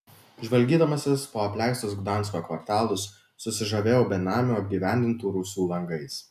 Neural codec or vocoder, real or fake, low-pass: none; real; 14.4 kHz